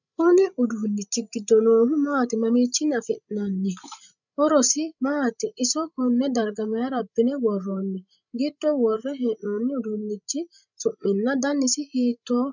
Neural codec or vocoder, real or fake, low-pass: codec, 16 kHz, 16 kbps, FreqCodec, larger model; fake; 7.2 kHz